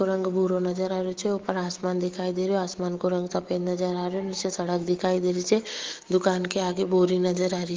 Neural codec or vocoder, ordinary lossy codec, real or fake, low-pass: autoencoder, 48 kHz, 128 numbers a frame, DAC-VAE, trained on Japanese speech; Opus, 16 kbps; fake; 7.2 kHz